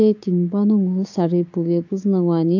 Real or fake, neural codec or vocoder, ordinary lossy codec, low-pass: fake; codec, 16 kHz, 6 kbps, DAC; AAC, 48 kbps; 7.2 kHz